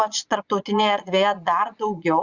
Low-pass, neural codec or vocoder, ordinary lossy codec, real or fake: 7.2 kHz; vocoder, 22.05 kHz, 80 mel bands, Vocos; Opus, 64 kbps; fake